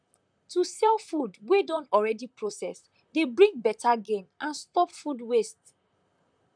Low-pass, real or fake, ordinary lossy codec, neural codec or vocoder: 9.9 kHz; fake; none; vocoder, 44.1 kHz, 128 mel bands, Pupu-Vocoder